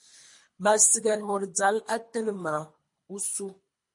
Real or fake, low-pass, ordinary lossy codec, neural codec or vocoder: fake; 10.8 kHz; MP3, 48 kbps; codec, 24 kHz, 3 kbps, HILCodec